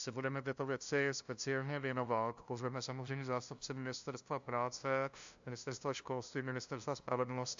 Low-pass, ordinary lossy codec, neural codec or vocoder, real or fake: 7.2 kHz; AAC, 64 kbps; codec, 16 kHz, 0.5 kbps, FunCodec, trained on LibriTTS, 25 frames a second; fake